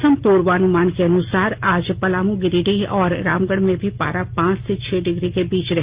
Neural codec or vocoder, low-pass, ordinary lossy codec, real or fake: none; 3.6 kHz; Opus, 32 kbps; real